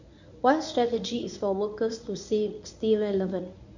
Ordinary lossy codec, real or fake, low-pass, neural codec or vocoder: none; fake; 7.2 kHz; codec, 24 kHz, 0.9 kbps, WavTokenizer, medium speech release version 1